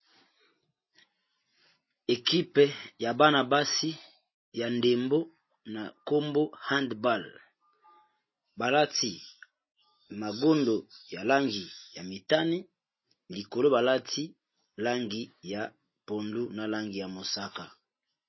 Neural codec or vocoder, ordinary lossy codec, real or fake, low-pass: none; MP3, 24 kbps; real; 7.2 kHz